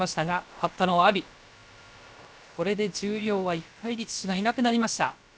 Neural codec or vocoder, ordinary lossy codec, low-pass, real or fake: codec, 16 kHz, about 1 kbps, DyCAST, with the encoder's durations; none; none; fake